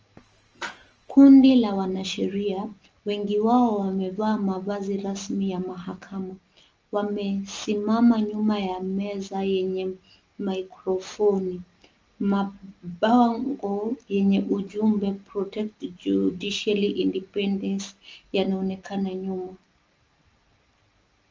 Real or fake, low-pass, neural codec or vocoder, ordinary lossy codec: real; 7.2 kHz; none; Opus, 24 kbps